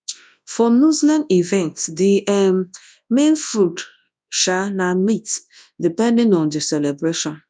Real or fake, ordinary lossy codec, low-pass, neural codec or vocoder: fake; none; 9.9 kHz; codec, 24 kHz, 0.9 kbps, WavTokenizer, large speech release